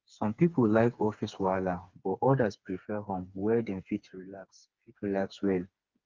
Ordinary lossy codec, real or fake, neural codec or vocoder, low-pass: Opus, 24 kbps; fake; codec, 16 kHz, 4 kbps, FreqCodec, smaller model; 7.2 kHz